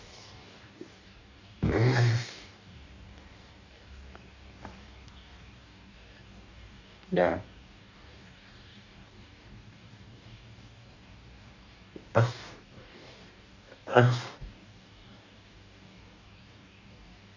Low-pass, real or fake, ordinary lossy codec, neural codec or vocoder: 7.2 kHz; fake; none; codec, 44.1 kHz, 2.6 kbps, DAC